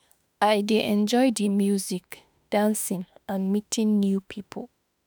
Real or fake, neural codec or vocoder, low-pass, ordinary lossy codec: fake; autoencoder, 48 kHz, 32 numbers a frame, DAC-VAE, trained on Japanese speech; none; none